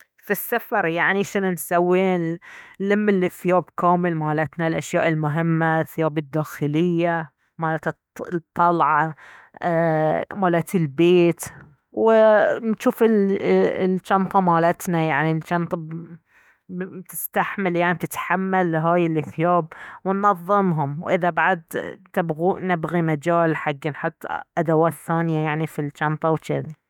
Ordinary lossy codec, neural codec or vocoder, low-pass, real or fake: none; autoencoder, 48 kHz, 32 numbers a frame, DAC-VAE, trained on Japanese speech; 19.8 kHz; fake